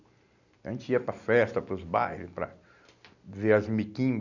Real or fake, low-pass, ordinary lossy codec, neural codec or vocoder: real; 7.2 kHz; none; none